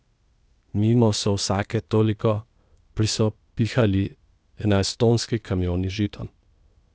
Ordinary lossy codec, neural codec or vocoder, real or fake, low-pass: none; codec, 16 kHz, 0.8 kbps, ZipCodec; fake; none